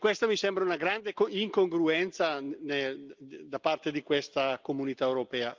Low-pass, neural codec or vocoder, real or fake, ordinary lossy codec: 7.2 kHz; none; real; Opus, 24 kbps